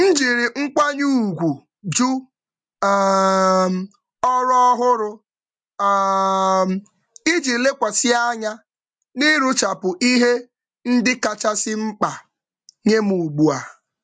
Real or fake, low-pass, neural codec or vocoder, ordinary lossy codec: real; 9.9 kHz; none; MP3, 64 kbps